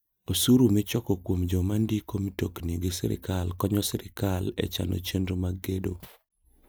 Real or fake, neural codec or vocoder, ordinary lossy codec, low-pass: real; none; none; none